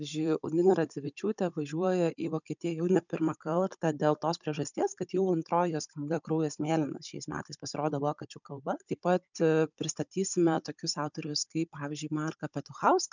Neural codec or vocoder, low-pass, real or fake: codec, 16 kHz, 16 kbps, FunCodec, trained on Chinese and English, 50 frames a second; 7.2 kHz; fake